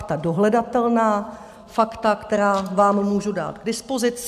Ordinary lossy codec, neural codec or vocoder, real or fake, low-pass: AAC, 96 kbps; none; real; 14.4 kHz